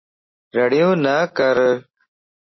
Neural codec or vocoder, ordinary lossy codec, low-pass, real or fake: none; MP3, 24 kbps; 7.2 kHz; real